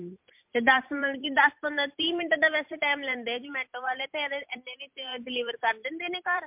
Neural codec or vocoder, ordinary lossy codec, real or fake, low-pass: vocoder, 44.1 kHz, 128 mel bands every 256 samples, BigVGAN v2; MP3, 32 kbps; fake; 3.6 kHz